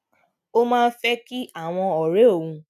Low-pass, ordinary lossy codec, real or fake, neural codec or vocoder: 14.4 kHz; none; real; none